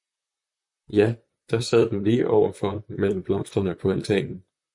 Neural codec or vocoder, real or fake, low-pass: vocoder, 44.1 kHz, 128 mel bands, Pupu-Vocoder; fake; 10.8 kHz